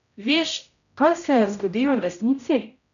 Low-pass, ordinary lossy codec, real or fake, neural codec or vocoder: 7.2 kHz; none; fake; codec, 16 kHz, 0.5 kbps, X-Codec, HuBERT features, trained on general audio